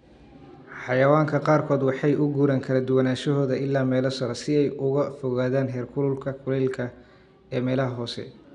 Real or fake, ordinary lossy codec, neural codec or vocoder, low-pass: real; none; none; 10.8 kHz